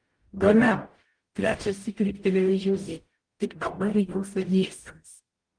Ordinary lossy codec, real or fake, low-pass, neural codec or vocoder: Opus, 24 kbps; fake; 9.9 kHz; codec, 44.1 kHz, 0.9 kbps, DAC